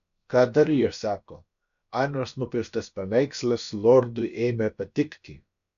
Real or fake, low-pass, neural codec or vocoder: fake; 7.2 kHz; codec, 16 kHz, about 1 kbps, DyCAST, with the encoder's durations